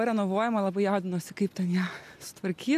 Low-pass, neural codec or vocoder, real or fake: 14.4 kHz; none; real